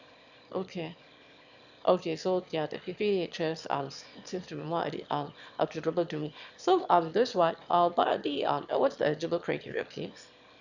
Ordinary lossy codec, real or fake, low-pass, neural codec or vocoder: none; fake; 7.2 kHz; autoencoder, 22.05 kHz, a latent of 192 numbers a frame, VITS, trained on one speaker